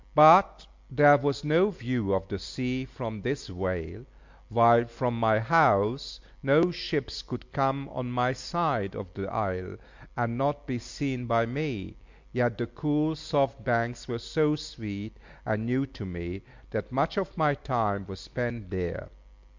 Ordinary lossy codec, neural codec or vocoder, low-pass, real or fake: MP3, 64 kbps; none; 7.2 kHz; real